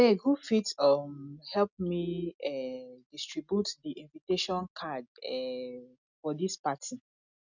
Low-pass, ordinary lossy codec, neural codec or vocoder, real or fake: 7.2 kHz; none; none; real